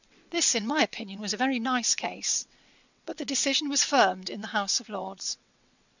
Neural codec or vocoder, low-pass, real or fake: vocoder, 22.05 kHz, 80 mel bands, WaveNeXt; 7.2 kHz; fake